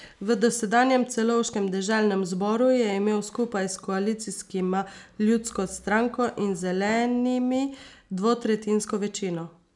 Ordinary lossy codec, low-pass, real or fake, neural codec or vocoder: none; 10.8 kHz; real; none